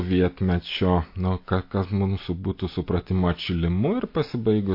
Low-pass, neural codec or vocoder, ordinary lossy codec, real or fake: 5.4 kHz; none; MP3, 32 kbps; real